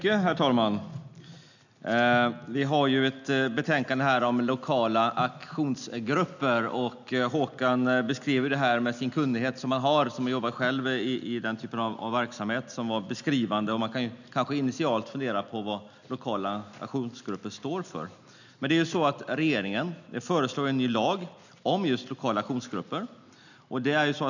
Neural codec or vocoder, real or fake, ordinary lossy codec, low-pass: none; real; none; 7.2 kHz